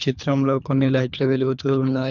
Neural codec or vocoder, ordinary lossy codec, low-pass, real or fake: codec, 24 kHz, 3 kbps, HILCodec; none; 7.2 kHz; fake